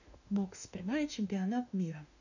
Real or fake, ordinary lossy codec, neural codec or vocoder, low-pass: fake; none; autoencoder, 48 kHz, 32 numbers a frame, DAC-VAE, trained on Japanese speech; 7.2 kHz